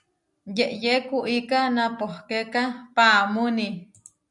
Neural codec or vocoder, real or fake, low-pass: none; real; 10.8 kHz